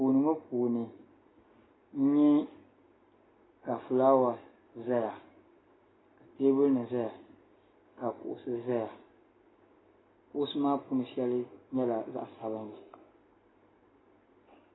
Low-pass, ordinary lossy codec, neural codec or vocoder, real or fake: 7.2 kHz; AAC, 16 kbps; none; real